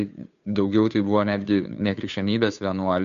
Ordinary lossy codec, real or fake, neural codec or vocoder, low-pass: AAC, 96 kbps; fake; codec, 16 kHz, 2 kbps, FunCodec, trained on Chinese and English, 25 frames a second; 7.2 kHz